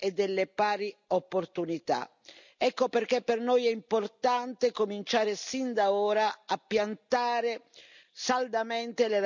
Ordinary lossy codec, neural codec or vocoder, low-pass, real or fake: none; none; 7.2 kHz; real